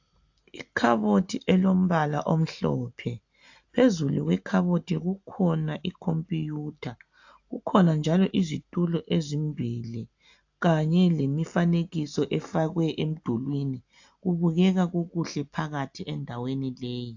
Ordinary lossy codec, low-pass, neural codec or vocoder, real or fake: AAC, 48 kbps; 7.2 kHz; none; real